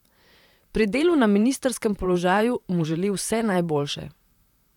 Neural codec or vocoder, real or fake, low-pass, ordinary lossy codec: vocoder, 44.1 kHz, 128 mel bands, Pupu-Vocoder; fake; 19.8 kHz; none